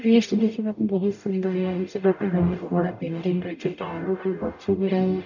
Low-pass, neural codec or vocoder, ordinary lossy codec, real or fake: 7.2 kHz; codec, 44.1 kHz, 0.9 kbps, DAC; none; fake